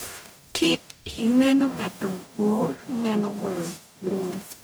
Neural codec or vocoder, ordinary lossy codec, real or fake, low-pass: codec, 44.1 kHz, 0.9 kbps, DAC; none; fake; none